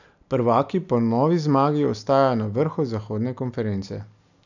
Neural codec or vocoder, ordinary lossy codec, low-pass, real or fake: none; none; 7.2 kHz; real